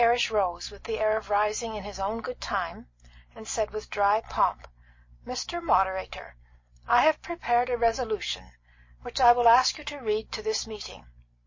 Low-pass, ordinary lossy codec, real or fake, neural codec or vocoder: 7.2 kHz; MP3, 32 kbps; real; none